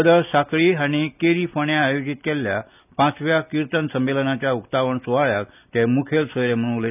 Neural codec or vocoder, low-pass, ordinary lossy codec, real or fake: none; 3.6 kHz; none; real